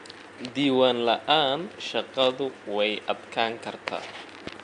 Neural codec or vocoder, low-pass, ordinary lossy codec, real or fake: none; 9.9 kHz; MP3, 64 kbps; real